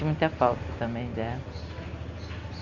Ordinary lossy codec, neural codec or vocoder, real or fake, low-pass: Opus, 64 kbps; none; real; 7.2 kHz